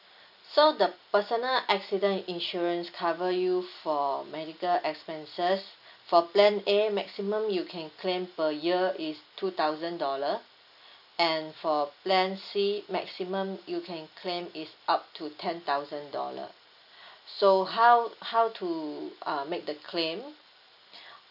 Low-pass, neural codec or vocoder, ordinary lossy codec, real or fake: 5.4 kHz; none; none; real